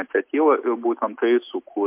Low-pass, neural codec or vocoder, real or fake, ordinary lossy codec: 3.6 kHz; none; real; MP3, 32 kbps